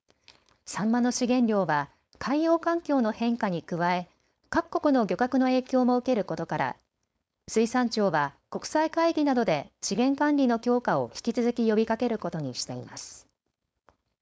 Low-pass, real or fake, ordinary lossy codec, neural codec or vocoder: none; fake; none; codec, 16 kHz, 4.8 kbps, FACodec